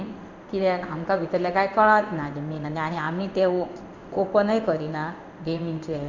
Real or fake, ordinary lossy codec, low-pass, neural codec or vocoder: fake; none; 7.2 kHz; codec, 16 kHz in and 24 kHz out, 1 kbps, XY-Tokenizer